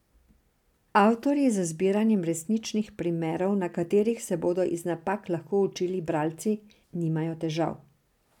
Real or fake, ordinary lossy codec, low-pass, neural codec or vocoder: real; none; 19.8 kHz; none